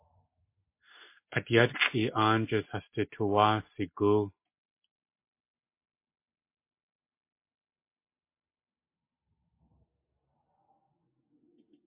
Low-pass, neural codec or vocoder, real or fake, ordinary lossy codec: 3.6 kHz; none; real; MP3, 24 kbps